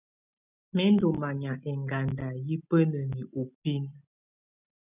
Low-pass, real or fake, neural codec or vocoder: 3.6 kHz; real; none